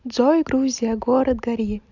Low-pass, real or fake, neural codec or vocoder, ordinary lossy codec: 7.2 kHz; real; none; none